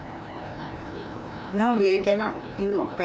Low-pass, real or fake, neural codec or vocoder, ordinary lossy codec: none; fake; codec, 16 kHz, 1 kbps, FreqCodec, larger model; none